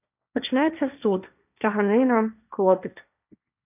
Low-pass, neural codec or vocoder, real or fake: 3.6 kHz; codec, 16 kHz, 1.1 kbps, Voila-Tokenizer; fake